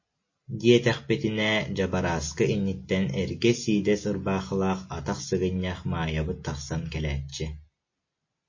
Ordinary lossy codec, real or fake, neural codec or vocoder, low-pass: MP3, 32 kbps; real; none; 7.2 kHz